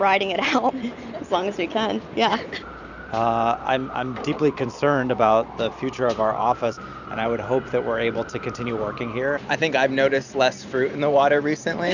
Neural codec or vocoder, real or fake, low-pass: vocoder, 44.1 kHz, 128 mel bands every 512 samples, BigVGAN v2; fake; 7.2 kHz